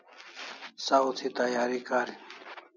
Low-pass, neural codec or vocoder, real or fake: 7.2 kHz; none; real